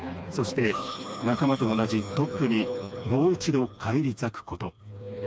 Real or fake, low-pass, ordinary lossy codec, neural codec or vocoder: fake; none; none; codec, 16 kHz, 2 kbps, FreqCodec, smaller model